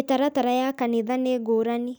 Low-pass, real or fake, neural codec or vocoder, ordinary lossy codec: none; real; none; none